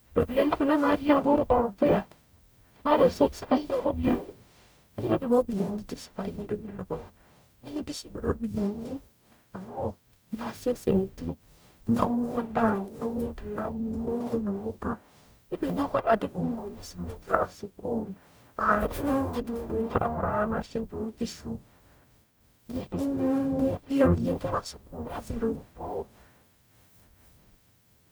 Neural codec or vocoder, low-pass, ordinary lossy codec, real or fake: codec, 44.1 kHz, 0.9 kbps, DAC; none; none; fake